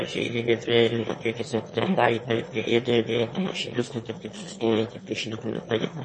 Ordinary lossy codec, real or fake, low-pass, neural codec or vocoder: MP3, 32 kbps; fake; 9.9 kHz; autoencoder, 22.05 kHz, a latent of 192 numbers a frame, VITS, trained on one speaker